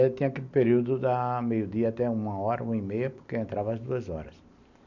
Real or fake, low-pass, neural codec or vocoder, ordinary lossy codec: real; 7.2 kHz; none; none